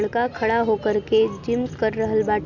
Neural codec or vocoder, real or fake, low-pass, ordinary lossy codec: none; real; 7.2 kHz; none